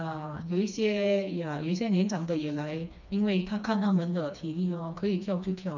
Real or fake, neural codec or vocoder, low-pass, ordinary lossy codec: fake; codec, 16 kHz, 2 kbps, FreqCodec, smaller model; 7.2 kHz; none